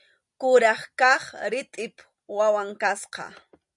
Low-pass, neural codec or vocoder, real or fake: 10.8 kHz; none; real